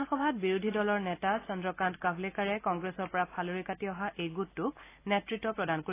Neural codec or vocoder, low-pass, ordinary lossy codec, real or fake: none; 3.6 kHz; AAC, 24 kbps; real